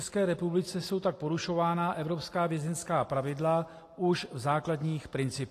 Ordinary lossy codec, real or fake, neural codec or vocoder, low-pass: AAC, 48 kbps; real; none; 14.4 kHz